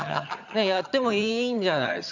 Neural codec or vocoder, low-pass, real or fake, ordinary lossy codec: vocoder, 22.05 kHz, 80 mel bands, HiFi-GAN; 7.2 kHz; fake; none